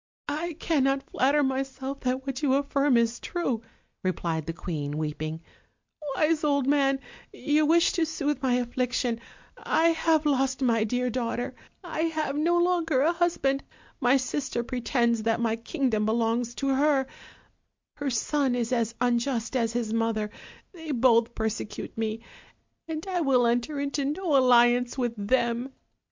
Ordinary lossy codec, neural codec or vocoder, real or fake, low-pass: MP3, 64 kbps; none; real; 7.2 kHz